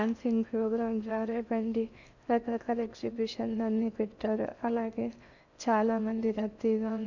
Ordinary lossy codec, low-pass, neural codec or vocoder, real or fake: none; 7.2 kHz; codec, 16 kHz in and 24 kHz out, 0.6 kbps, FocalCodec, streaming, 2048 codes; fake